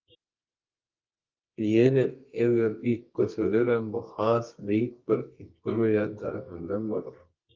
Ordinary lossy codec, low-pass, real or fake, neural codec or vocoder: Opus, 24 kbps; 7.2 kHz; fake; codec, 24 kHz, 0.9 kbps, WavTokenizer, medium music audio release